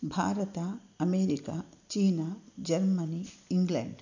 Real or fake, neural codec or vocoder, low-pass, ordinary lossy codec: real; none; 7.2 kHz; none